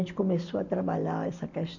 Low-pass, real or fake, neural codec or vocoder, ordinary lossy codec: 7.2 kHz; real; none; none